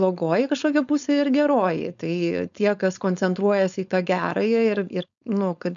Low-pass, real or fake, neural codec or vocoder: 7.2 kHz; fake; codec, 16 kHz, 4.8 kbps, FACodec